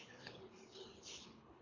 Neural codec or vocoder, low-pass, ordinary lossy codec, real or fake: codec, 24 kHz, 3 kbps, HILCodec; 7.2 kHz; MP3, 64 kbps; fake